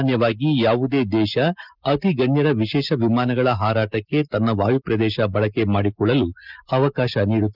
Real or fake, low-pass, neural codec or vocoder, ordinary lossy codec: real; 5.4 kHz; none; Opus, 32 kbps